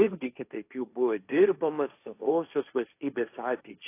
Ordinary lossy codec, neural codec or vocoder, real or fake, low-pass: AAC, 24 kbps; codec, 16 kHz, 1.1 kbps, Voila-Tokenizer; fake; 3.6 kHz